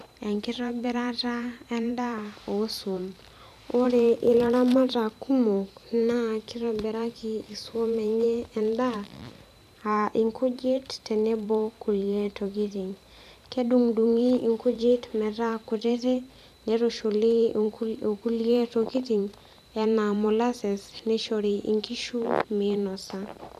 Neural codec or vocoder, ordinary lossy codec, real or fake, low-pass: vocoder, 44.1 kHz, 128 mel bands every 512 samples, BigVGAN v2; none; fake; 14.4 kHz